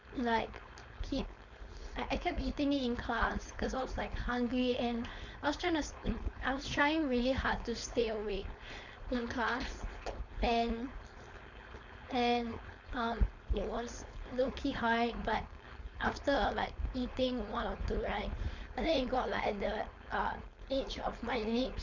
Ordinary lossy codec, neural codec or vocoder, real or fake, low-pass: none; codec, 16 kHz, 4.8 kbps, FACodec; fake; 7.2 kHz